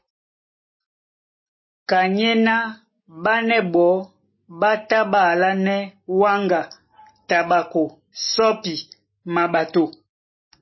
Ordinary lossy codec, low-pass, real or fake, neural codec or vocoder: MP3, 24 kbps; 7.2 kHz; real; none